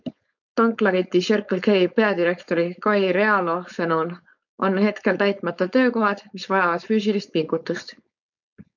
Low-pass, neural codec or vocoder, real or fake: 7.2 kHz; codec, 16 kHz, 4.8 kbps, FACodec; fake